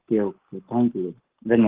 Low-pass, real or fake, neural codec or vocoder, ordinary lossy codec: 3.6 kHz; fake; codec, 44.1 kHz, 7.8 kbps, Pupu-Codec; Opus, 16 kbps